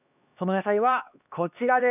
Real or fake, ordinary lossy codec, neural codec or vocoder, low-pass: fake; none; codec, 16 kHz, 2 kbps, X-Codec, HuBERT features, trained on LibriSpeech; 3.6 kHz